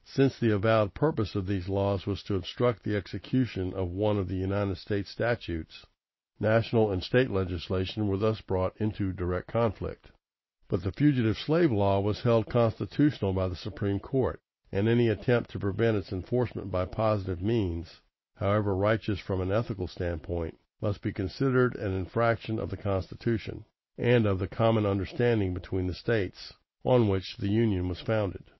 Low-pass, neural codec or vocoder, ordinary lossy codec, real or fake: 7.2 kHz; none; MP3, 24 kbps; real